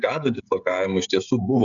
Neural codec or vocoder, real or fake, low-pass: codec, 16 kHz, 16 kbps, FreqCodec, smaller model; fake; 7.2 kHz